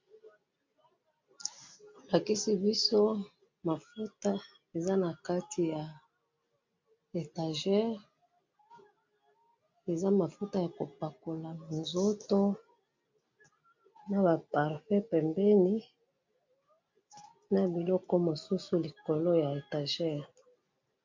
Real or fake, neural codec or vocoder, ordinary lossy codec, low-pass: real; none; MP3, 48 kbps; 7.2 kHz